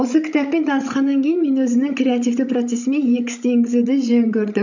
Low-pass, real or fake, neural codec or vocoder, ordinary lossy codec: 7.2 kHz; fake; codec, 16 kHz, 16 kbps, FreqCodec, larger model; none